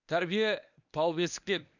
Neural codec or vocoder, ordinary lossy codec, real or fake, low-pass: codec, 24 kHz, 0.9 kbps, WavTokenizer, medium speech release version 1; none; fake; 7.2 kHz